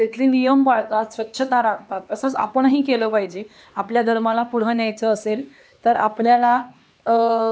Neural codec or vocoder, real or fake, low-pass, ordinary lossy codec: codec, 16 kHz, 2 kbps, X-Codec, HuBERT features, trained on LibriSpeech; fake; none; none